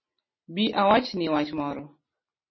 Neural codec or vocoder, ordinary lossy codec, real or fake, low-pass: none; MP3, 24 kbps; real; 7.2 kHz